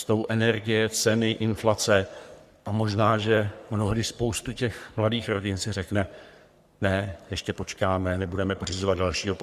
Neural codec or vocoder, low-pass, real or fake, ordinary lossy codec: codec, 44.1 kHz, 3.4 kbps, Pupu-Codec; 14.4 kHz; fake; Opus, 64 kbps